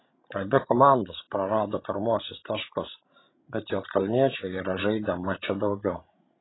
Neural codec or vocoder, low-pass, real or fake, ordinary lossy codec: codec, 16 kHz, 16 kbps, FreqCodec, larger model; 7.2 kHz; fake; AAC, 16 kbps